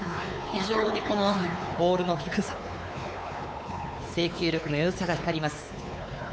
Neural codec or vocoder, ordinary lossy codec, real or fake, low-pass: codec, 16 kHz, 4 kbps, X-Codec, WavLM features, trained on Multilingual LibriSpeech; none; fake; none